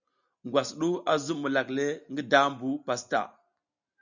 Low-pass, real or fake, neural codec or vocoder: 7.2 kHz; real; none